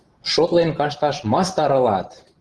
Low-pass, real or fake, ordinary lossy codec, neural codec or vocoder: 10.8 kHz; fake; Opus, 16 kbps; vocoder, 44.1 kHz, 128 mel bands every 512 samples, BigVGAN v2